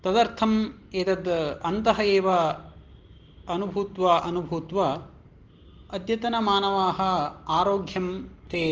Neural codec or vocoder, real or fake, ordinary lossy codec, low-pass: none; real; Opus, 16 kbps; 7.2 kHz